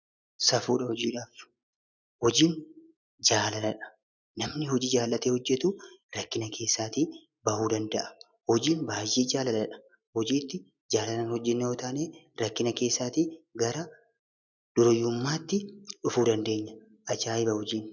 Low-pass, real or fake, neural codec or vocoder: 7.2 kHz; real; none